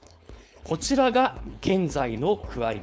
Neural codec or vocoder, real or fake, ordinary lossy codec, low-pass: codec, 16 kHz, 4.8 kbps, FACodec; fake; none; none